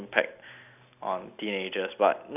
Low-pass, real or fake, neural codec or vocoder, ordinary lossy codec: 3.6 kHz; real; none; none